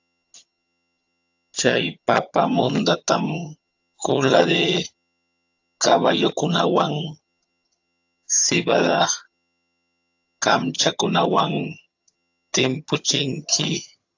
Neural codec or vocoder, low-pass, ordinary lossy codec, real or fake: vocoder, 22.05 kHz, 80 mel bands, HiFi-GAN; 7.2 kHz; AAC, 48 kbps; fake